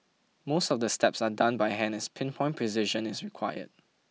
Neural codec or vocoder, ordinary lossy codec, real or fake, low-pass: none; none; real; none